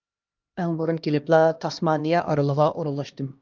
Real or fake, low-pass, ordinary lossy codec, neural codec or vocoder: fake; 7.2 kHz; Opus, 24 kbps; codec, 16 kHz, 1 kbps, X-Codec, HuBERT features, trained on LibriSpeech